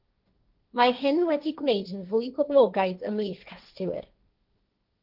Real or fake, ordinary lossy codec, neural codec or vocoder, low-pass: fake; Opus, 32 kbps; codec, 16 kHz, 1.1 kbps, Voila-Tokenizer; 5.4 kHz